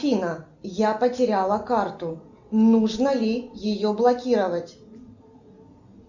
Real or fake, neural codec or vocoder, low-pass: real; none; 7.2 kHz